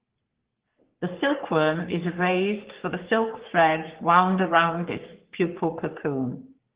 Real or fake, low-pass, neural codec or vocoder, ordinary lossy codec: fake; 3.6 kHz; codec, 44.1 kHz, 3.4 kbps, Pupu-Codec; Opus, 16 kbps